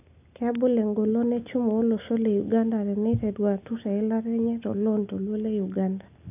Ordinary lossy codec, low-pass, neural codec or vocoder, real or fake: none; 3.6 kHz; none; real